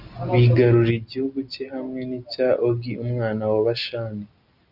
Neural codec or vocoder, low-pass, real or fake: none; 5.4 kHz; real